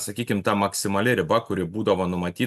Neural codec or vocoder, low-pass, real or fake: none; 14.4 kHz; real